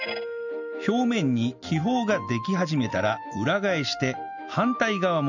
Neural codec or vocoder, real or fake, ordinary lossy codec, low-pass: none; real; none; 7.2 kHz